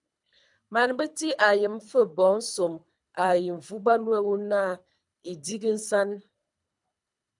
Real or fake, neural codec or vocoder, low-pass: fake; codec, 24 kHz, 3 kbps, HILCodec; 10.8 kHz